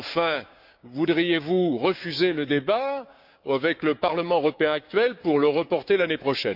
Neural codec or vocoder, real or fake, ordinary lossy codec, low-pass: codec, 16 kHz, 6 kbps, DAC; fake; none; 5.4 kHz